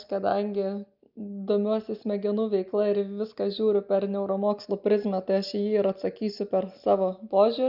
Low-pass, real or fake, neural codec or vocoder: 5.4 kHz; real; none